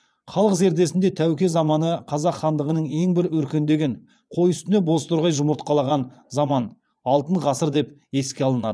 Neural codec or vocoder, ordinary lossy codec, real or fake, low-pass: vocoder, 22.05 kHz, 80 mel bands, Vocos; none; fake; none